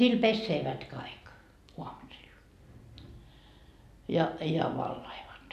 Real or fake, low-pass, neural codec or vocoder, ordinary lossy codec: real; 14.4 kHz; none; none